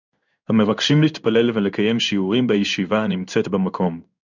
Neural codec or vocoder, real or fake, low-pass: codec, 16 kHz in and 24 kHz out, 1 kbps, XY-Tokenizer; fake; 7.2 kHz